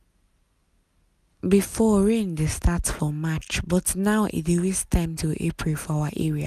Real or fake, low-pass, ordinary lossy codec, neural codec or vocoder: real; 14.4 kHz; none; none